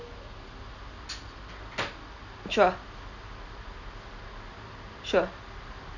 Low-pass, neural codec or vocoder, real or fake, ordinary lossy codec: 7.2 kHz; none; real; none